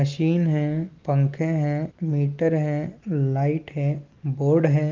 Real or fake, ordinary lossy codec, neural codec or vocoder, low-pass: real; Opus, 32 kbps; none; 7.2 kHz